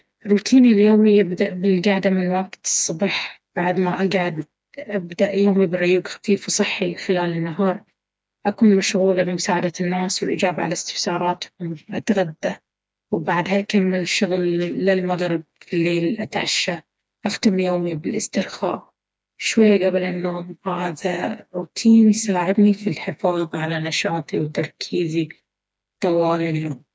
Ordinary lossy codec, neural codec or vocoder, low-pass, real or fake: none; codec, 16 kHz, 2 kbps, FreqCodec, smaller model; none; fake